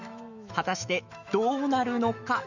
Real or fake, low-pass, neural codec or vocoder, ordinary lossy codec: fake; 7.2 kHz; autoencoder, 48 kHz, 128 numbers a frame, DAC-VAE, trained on Japanese speech; none